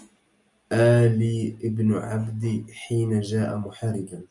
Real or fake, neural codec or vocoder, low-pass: real; none; 10.8 kHz